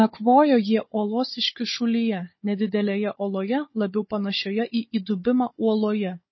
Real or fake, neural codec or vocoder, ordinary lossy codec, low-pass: fake; codec, 16 kHz, 16 kbps, FunCodec, trained on Chinese and English, 50 frames a second; MP3, 24 kbps; 7.2 kHz